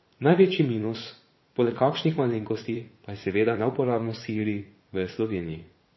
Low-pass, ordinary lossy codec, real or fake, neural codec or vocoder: 7.2 kHz; MP3, 24 kbps; fake; vocoder, 44.1 kHz, 80 mel bands, Vocos